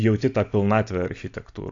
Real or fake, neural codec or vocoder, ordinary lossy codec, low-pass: real; none; AAC, 48 kbps; 7.2 kHz